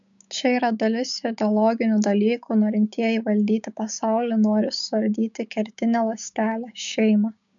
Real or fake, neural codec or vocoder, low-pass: fake; codec, 16 kHz, 6 kbps, DAC; 7.2 kHz